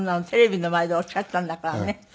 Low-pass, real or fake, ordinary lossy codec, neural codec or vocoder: none; real; none; none